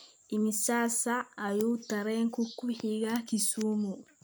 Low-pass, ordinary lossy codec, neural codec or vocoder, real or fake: none; none; none; real